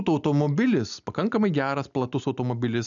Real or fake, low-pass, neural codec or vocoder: real; 7.2 kHz; none